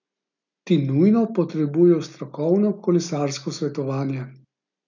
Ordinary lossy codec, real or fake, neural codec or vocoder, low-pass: none; real; none; 7.2 kHz